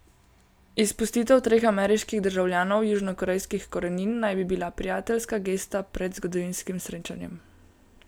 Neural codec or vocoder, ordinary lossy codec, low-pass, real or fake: none; none; none; real